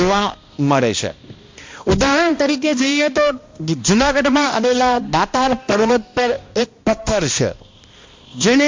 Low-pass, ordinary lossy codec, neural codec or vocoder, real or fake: 7.2 kHz; MP3, 48 kbps; codec, 16 kHz, 1 kbps, X-Codec, HuBERT features, trained on balanced general audio; fake